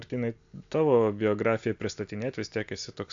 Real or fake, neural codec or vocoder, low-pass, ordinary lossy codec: real; none; 7.2 kHz; AAC, 64 kbps